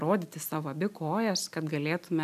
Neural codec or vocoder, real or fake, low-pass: none; real; 14.4 kHz